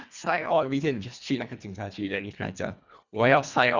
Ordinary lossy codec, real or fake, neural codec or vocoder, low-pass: Opus, 64 kbps; fake; codec, 24 kHz, 1.5 kbps, HILCodec; 7.2 kHz